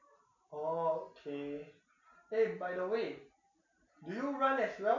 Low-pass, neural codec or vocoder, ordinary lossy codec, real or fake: 7.2 kHz; none; none; real